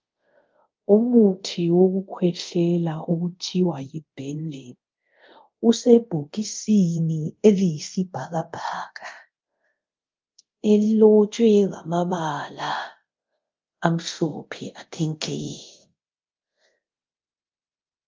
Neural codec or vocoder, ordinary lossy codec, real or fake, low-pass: codec, 24 kHz, 0.5 kbps, DualCodec; Opus, 32 kbps; fake; 7.2 kHz